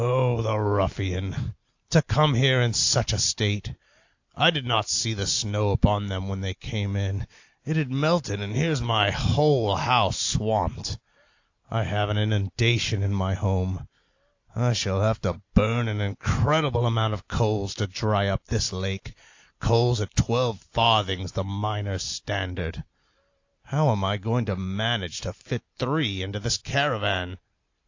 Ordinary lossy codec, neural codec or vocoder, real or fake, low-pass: AAC, 48 kbps; none; real; 7.2 kHz